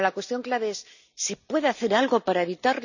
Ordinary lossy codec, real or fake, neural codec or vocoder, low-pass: none; real; none; 7.2 kHz